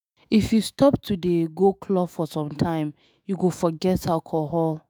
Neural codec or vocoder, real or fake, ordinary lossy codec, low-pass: autoencoder, 48 kHz, 128 numbers a frame, DAC-VAE, trained on Japanese speech; fake; none; none